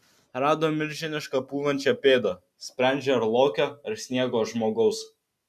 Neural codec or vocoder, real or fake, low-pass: none; real; 14.4 kHz